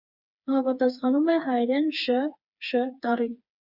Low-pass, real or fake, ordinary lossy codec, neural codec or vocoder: 5.4 kHz; fake; Opus, 64 kbps; codec, 16 kHz, 4 kbps, FreqCodec, smaller model